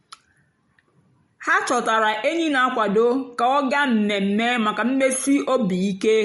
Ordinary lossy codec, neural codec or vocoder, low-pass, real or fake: MP3, 48 kbps; none; 14.4 kHz; real